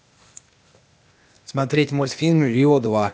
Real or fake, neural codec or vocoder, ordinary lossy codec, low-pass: fake; codec, 16 kHz, 0.8 kbps, ZipCodec; none; none